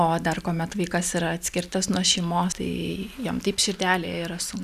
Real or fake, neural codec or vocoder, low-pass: real; none; 14.4 kHz